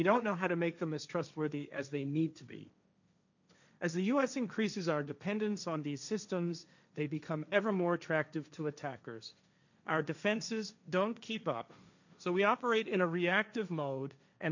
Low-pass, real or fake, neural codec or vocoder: 7.2 kHz; fake; codec, 16 kHz, 1.1 kbps, Voila-Tokenizer